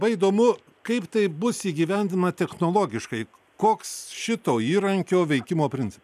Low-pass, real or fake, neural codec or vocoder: 14.4 kHz; real; none